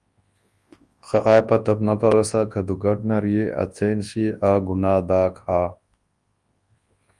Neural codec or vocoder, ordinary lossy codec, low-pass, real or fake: codec, 24 kHz, 0.9 kbps, WavTokenizer, large speech release; Opus, 32 kbps; 10.8 kHz; fake